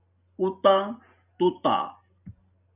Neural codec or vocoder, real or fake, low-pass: none; real; 3.6 kHz